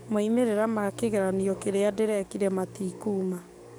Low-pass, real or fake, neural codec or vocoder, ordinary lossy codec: none; fake; codec, 44.1 kHz, 7.8 kbps, DAC; none